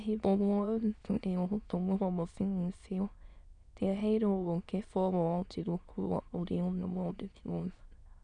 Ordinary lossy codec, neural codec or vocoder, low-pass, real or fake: none; autoencoder, 22.05 kHz, a latent of 192 numbers a frame, VITS, trained on many speakers; 9.9 kHz; fake